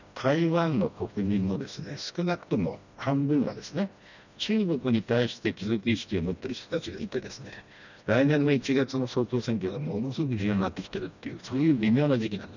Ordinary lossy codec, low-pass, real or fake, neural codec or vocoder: none; 7.2 kHz; fake; codec, 16 kHz, 1 kbps, FreqCodec, smaller model